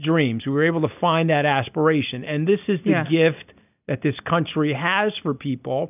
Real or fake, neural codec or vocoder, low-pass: real; none; 3.6 kHz